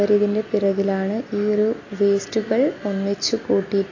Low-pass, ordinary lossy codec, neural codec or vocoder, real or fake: 7.2 kHz; AAC, 32 kbps; none; real